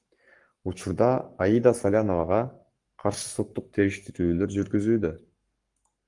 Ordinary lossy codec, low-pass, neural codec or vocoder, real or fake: Opus, 24 kbps; 10.8 kHz; codec, 44.1 kHz, 7.8 kbps, Pupu-Codec; fake